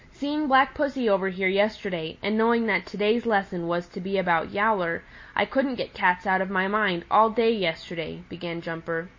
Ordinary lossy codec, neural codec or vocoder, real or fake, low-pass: MP3, 32 kbps; none; real; 7.2 kHz